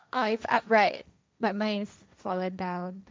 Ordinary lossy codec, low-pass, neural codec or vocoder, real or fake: none; none; codec, 16 kHz, 1.1 kbps, Voila-Tokenizer; fake